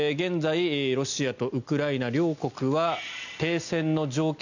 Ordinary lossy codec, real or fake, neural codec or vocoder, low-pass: none; real; none; 7.2 kHz